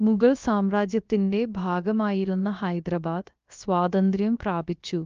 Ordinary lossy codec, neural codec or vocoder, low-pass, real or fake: Opus, 24 kbps; codec, 16 kHz, about 1 kbps, DyCAST, with the encoder's durations; 7.2 kHz; fake